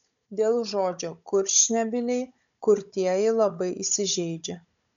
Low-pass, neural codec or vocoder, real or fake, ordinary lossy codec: 7.2 kHz; codec, 16 kHz, 16 kbps, FunCodec, trained on Chinese and English, 50 frames a second; fake; MP3, 96 kbps